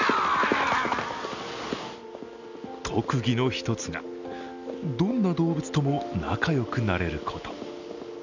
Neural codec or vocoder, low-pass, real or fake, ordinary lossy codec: none; 7.2 kHz; real; none